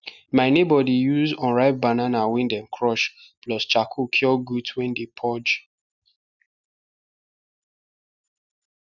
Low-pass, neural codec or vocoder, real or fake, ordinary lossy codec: 7.2 kHz; none; real; none